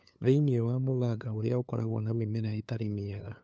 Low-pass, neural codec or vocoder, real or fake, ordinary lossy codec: none; codec, 16 kHz, 2 kbps, FunCodec, trained on LibriTTS, 25 frames a second; fake; none